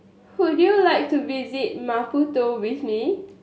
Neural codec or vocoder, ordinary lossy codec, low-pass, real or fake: none; none; none; real